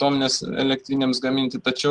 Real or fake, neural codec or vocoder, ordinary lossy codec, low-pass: real; none; Opus, 24 kbps; 9.9 kHz